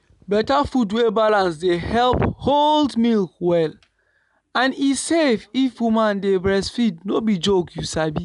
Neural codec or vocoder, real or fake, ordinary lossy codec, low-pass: none; real; none; 10.8 kHz